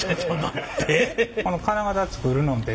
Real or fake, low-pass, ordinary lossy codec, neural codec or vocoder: real; none; none; none